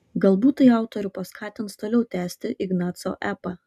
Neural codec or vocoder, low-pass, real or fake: none; 14.4 kHz; real